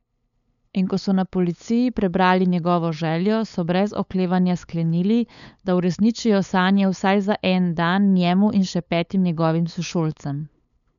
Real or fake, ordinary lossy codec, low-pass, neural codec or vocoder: fake; none; 7.2 kHz; codec, 16 kHz, 8 kbps, FunCodec, trained on LibriTTS, 25 frames a second